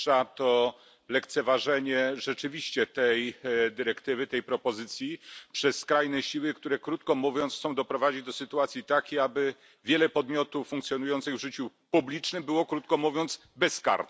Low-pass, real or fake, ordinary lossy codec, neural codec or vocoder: none; real; none; none